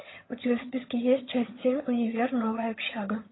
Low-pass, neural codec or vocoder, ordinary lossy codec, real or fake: 7.2 kHz; vocoder, 22.05 kHz, 80 mel bands, HiFi-GAN; AAC, 16 kbps; fake